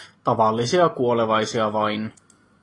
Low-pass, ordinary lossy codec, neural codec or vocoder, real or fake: 10.8 kHz; AAC, 32 kbps; vocoder, 44.1 kHz, 128 mel bands every 256 samples, BigVGAN v2; fake